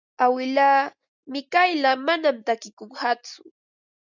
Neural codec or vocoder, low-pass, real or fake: none; 7.2 kHz; real